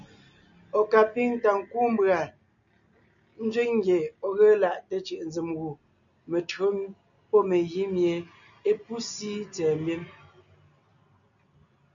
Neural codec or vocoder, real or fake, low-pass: none; real; 7.2 kHz